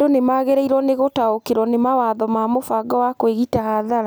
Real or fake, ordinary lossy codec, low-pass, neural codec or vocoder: real; none; none; none